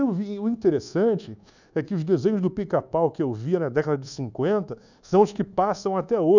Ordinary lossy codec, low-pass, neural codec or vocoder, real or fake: none; 7.2 kHz; codec, 24 kHz, 1.2 kbps, DualCodec; fake